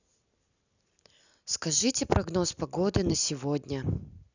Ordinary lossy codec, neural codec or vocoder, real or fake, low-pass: none; none; real; 7.2 kHz